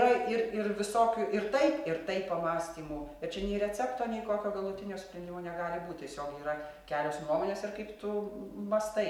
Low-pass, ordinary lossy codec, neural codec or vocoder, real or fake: 19.8 kHz; MP3, 96 kbps; none; real